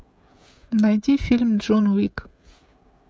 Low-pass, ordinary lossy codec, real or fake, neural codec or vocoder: none; none; fake; codec, 16 kHz, 16 kbps, FreqCodec, smaller model